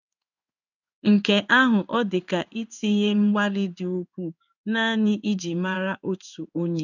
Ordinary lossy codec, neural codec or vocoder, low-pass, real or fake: none; codec, 16 kHz in and 24 kHz out, 1 kbps, XY-Tokenizer; 7.2 kHz; fake